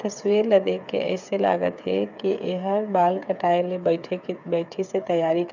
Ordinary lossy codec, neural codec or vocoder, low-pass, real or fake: none; codec, 16 kHz, 8 kbps, FreqCodec, smaller model; 7.2 kHz; fake